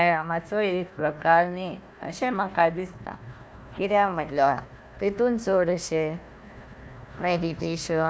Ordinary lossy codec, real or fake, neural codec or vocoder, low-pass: none; fake; codec, 16 kHz, 1 kbps, FunCodec, trained on Chinese and English, 50 frames a second; none